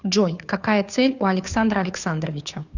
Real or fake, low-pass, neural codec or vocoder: fake; 7.2 kHz; codec, 16 kHz, 2 kbps, FunCodec, trained on Chinese and English, 25 frames a second